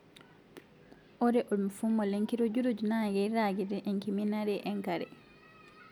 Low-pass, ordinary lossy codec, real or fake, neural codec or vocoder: 19.8 kHz; none; real; none